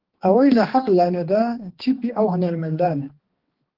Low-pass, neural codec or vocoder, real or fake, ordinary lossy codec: 5.4 kHz; codec, 16 kHz, 2 kbps, X-Codec, HuBERT features, trained on balanced general audio; fake; Opus, 16 kbps